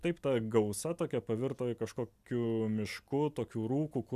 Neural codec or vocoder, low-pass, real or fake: none; 14.4 kHz; real